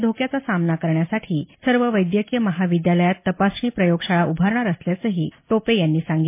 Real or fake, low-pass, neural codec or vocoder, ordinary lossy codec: real; 3.6 kHz; none; MP3, 24 kbps